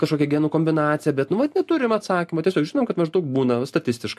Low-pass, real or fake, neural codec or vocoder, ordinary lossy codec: 14.4 kHz; real; none; MP3, 64 kbps